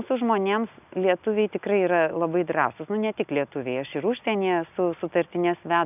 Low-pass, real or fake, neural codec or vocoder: 3.6 kHz; real; none